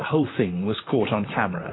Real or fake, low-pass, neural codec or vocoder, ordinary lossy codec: fake; 7.2 kHz; vocoder, 44.1 kHz, 128 mel bands, Pupu-Vocoder; AAC, 16 kbps